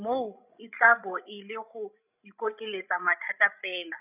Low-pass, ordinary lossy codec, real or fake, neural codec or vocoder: 3.6 kHz; none; fake; codec, 16 kHz, 16 kbps, FreqCodec, larger model